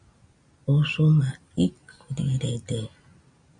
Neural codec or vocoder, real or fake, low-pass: none; real; 9.9 kHz